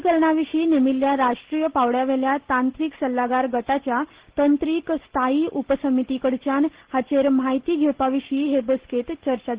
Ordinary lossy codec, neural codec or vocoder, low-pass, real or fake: Opus, 16 kbps; none; 3.6 kHz; real